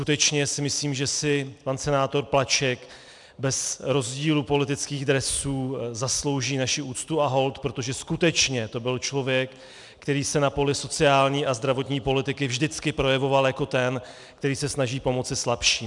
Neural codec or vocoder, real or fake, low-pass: none; real; 10.8 kHz